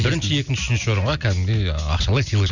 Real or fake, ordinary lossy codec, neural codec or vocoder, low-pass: real; none; none; 7.2 kHz